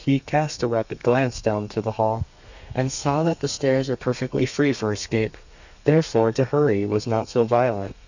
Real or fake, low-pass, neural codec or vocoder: fake; 7.2 kHz; codec, 32 kHz, 1.9 kbps, SNAC